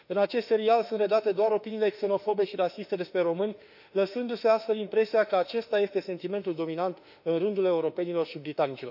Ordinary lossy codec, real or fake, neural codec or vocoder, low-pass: none; fake; autoencoder, 48 kHz, 32 numbers a frame, DAC-VAE, trained on Japanese speech; 5.4 kHz